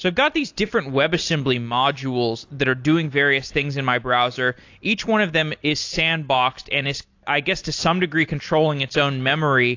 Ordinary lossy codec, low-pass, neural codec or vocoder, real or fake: AAC, 48 kbps; 7.2 kHz; none; real